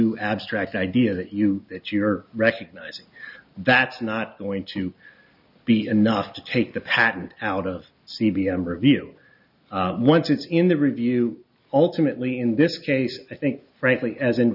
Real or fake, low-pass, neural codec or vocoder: real; 5.4 kHz; none